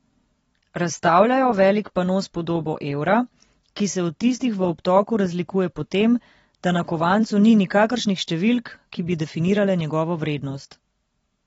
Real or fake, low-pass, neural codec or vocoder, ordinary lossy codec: real; 10.8 kHz; none; AAC, 24 kbps